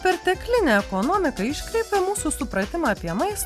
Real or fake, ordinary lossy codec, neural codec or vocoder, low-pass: real; Opus, 64 kbps; none; 14.4 kHz